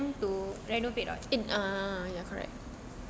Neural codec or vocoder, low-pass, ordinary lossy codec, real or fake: none; none; none; real